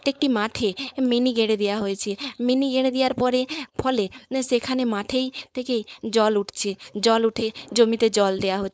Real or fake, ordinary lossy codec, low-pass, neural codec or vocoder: fake; none; none; codec, 16 kHz, 4.8 kbps, FACodec